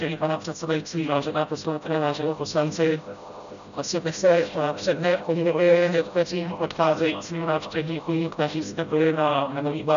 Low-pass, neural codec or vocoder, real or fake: 7.2 kHz; codec, 16 kHz, 0.5 kbps, FreqCodec, smaller model; fake